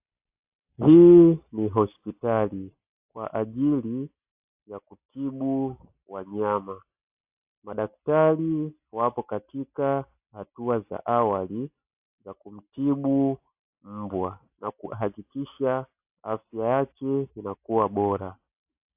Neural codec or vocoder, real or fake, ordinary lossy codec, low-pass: none; real; AAC, 32 kbps; 3.6 kHz